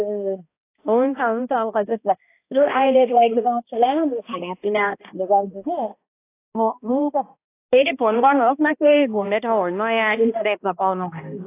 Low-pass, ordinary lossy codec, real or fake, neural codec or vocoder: 3.6 kHz; AAC, 24 kbps; fake; codec, 16 kHz, 1 kbps, X-Codec, HuBERT features, trained on balanced general audio